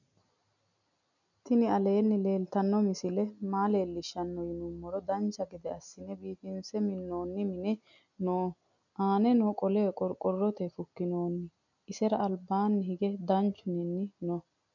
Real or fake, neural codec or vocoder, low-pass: real; none; 7.2 kHz